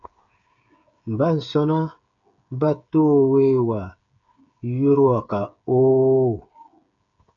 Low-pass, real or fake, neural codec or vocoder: 7.2 kHz; fake; codec, 16 kHz, 8 kbps, FreqCodec, smaller model